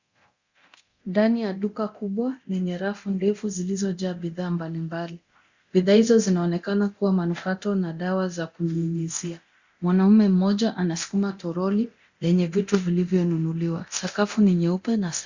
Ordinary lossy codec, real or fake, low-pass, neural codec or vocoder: Opus, 64 kbps; fake; 7.2 kHz; codec, 24 kHz, 0.9 kbps, DualCodec